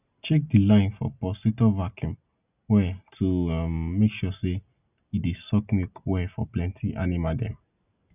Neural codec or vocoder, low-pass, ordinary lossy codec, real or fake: none; 3.6 kHz; none; real